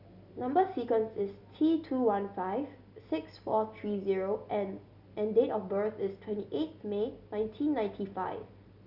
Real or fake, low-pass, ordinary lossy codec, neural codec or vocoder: real; 5.4 kHz; none; none